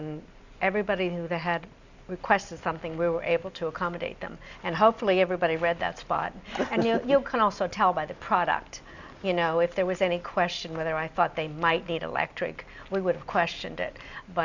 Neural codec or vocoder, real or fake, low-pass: none; real; 7.2 kHz